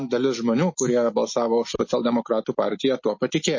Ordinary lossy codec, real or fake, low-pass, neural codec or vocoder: MP3, 32 kbps; real; 7.2 kHz; none